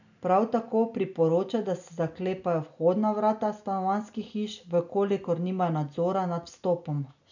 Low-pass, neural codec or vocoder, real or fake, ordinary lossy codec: 7.2 kHz; none; real; none